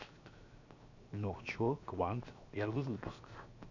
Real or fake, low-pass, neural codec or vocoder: fake; 7.2 kHz; codec, 16 kHz, 0.7 kbps, FocalCodec